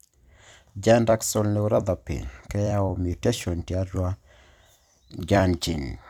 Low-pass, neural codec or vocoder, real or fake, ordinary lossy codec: 19.8 kHz; vocoder, 44.1 kHz, 128 mel bands every 256 samples, BigVGAN v2; fake; none